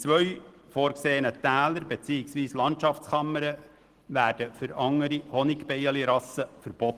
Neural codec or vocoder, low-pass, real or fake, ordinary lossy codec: none; 14.4 kHz; real; Opus, 16 kbps